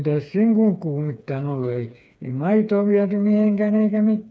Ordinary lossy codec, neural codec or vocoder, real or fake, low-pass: none; codec, 16 kHz, 4 kbps, FreqCodec, smaller model; fake; none